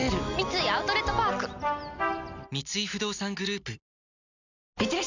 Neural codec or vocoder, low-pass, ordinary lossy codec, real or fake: none; 7.2 kHz; Opus, 64 kbps; real